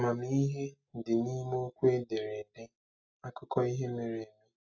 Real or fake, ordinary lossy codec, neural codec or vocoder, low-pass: real; AAC, 48 kbps; none; 7.2 kHz